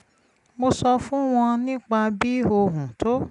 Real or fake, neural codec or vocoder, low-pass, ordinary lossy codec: real; none; 10.8 kHz; none